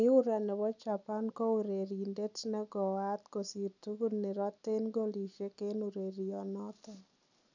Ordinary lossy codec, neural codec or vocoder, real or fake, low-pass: none; none; real; 7.2 kHz